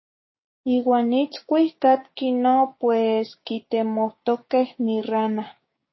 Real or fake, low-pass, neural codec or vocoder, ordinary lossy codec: fake; 7.2 kHz; codec, 16 kHz, 6 kbps, DAC; MP3, 24 kbps